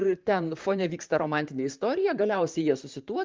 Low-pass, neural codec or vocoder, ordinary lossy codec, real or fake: 7.2 kHz; vocoder, 44.1 kHz, 128 mel bands, Pupu-Vocoder; Opus, 24 kbps; fake